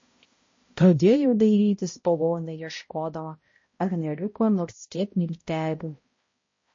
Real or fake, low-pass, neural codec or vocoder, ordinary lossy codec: fake; 7.2 kHz; codec, 16 kHz, 0.5 kbps, X-Codec, HuBERT features, trained on balanced general audio; MP3, 32 kbps